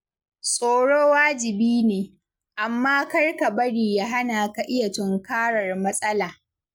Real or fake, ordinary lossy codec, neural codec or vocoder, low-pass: real; none; none; none